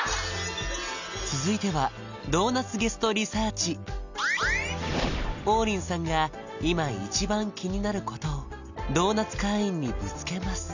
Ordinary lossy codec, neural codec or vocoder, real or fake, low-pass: none; none; real; 7.2 kHz